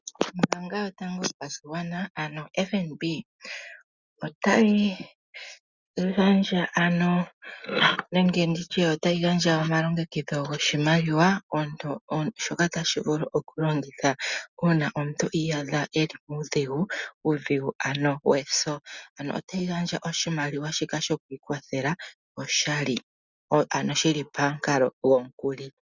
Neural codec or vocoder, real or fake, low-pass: none; real; 7.2 kHz